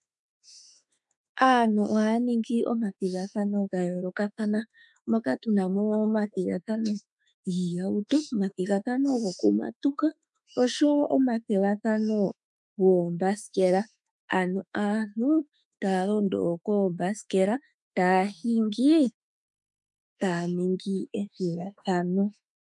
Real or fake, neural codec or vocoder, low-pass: fake; autoencoder, 48 kHz, 32 numbers a frame, DAC-VAE, trained on Japanese speech; 10.8 kHz